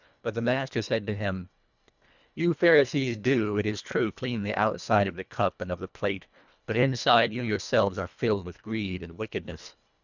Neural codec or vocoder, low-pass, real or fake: codec, 24 kHz, 1.5 kbps, HILCodec; 7.2 kHz; fake